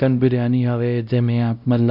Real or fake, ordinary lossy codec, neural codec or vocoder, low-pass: fake; none; codec, 16 kHz, 0.5 kbps, X-Codec, WavLM features, trained on Multilingual LibriSpeech; 5.4 kHz